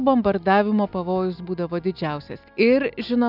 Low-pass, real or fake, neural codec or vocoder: 5.4 kHz; real; none